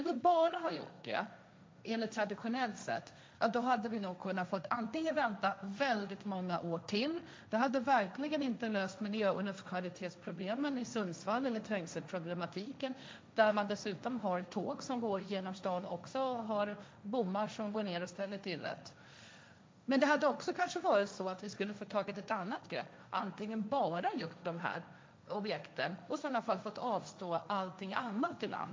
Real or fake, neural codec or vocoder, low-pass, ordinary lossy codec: fake; codec, 16 kHz, 1.1 kbps, Voila-Tokenizer; none; none